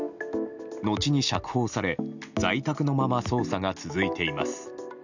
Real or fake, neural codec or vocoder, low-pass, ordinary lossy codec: real; none; 7.2 kHz; none